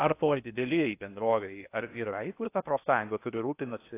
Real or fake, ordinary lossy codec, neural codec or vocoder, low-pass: fake; AAC, 24 kbps; codec, 16 kHz in and 24 kHz out, 0.6 kbps, FocalCodec, streaming, 2048 codes; 3.6 kHz